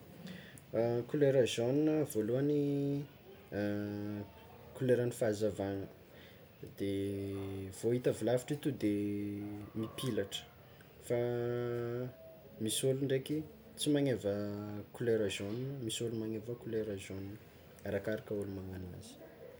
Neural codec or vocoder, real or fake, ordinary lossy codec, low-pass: none; real; none; none